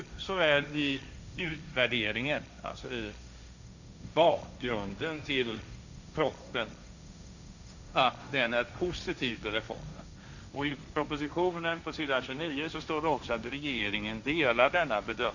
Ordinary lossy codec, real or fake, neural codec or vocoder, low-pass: none; fake; codec, 16 kHz, 1.1 kbps, Voila-Tokenizer; 7.2 kHz